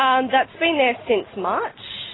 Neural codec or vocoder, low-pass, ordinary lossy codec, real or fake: none; 7.2 kHz; AAC, 16 kbps; real